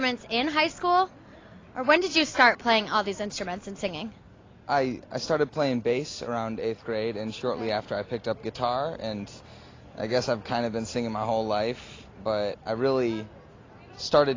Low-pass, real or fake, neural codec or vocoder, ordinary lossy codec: 7.2 kHz; real; none; AAC, 32 kbps